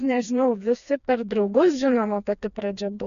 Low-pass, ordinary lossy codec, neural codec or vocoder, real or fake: 7.2 kHz; MP3, 96 kbps; codec, 16 kHz, 2 kbps, FreqCodec, smaller model; fake